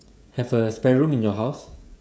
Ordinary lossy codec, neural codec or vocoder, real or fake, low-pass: none; codec, 16 kHz, 16 kbps, FreqCodec, smaller model; fake; none